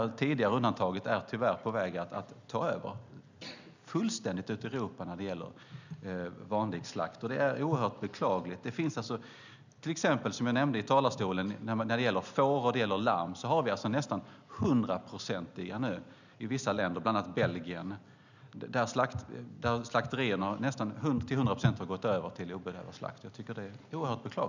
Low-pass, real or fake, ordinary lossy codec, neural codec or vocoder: 7.2 kHz; real; none; none